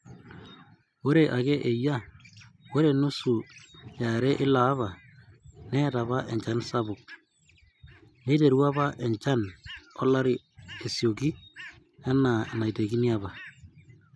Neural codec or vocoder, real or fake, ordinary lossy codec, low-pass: none; real; none; none